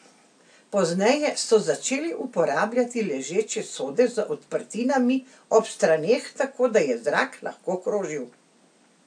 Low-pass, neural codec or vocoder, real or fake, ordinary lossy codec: 9.9 kHz; none; real; AAC, 64 kbps